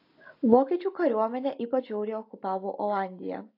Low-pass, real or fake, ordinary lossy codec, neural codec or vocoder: 5.4 kHz; real; AAC, 32 kbps; none